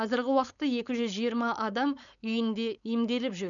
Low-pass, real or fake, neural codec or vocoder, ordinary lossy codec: 7.2 kHz; fake; codec, 16 kHz, 4.8 kbps, FACodec; none